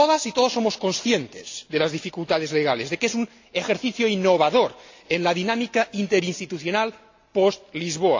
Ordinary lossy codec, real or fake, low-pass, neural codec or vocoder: AAC, 48 kbps; real; 7.2 kHz; none